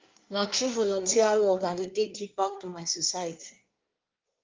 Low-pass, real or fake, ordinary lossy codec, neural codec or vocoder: 7.2 kHz; fake; Opus, 32 kbps; codec, 24 kHz, 1 kbps, SNAC